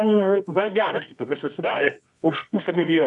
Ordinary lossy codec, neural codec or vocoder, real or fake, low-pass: MP3, 96 kbps; codec, 24 kHz, 0.9 kbps, WavTokenizer, medium music audio release; fake; 10.8 kHz